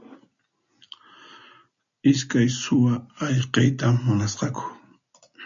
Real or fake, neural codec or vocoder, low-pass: real; none; 7.2 kHz